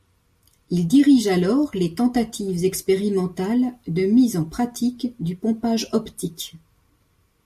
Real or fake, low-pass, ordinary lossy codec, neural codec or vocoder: real; 14.4 kHz; MP3, 64 kbps; none